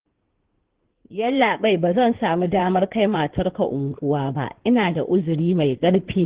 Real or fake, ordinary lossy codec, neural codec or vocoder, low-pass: fake; Opus, 16 kbps; codec, 16 kHz in and 24 kHz out, 2.2 kbps, FireRedTTS-2 codec; 3.6 kHz